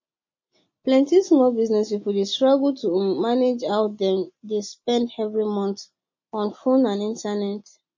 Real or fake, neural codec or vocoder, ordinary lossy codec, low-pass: real; none; MP3, 32 kbps; 7.2 kHz